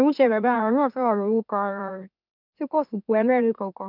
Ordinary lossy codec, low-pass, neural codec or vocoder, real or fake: none; 5.4 kHz; autoencoder, 44.1 kHz, a latent of 192 numbers a frame, MeloTTS; fake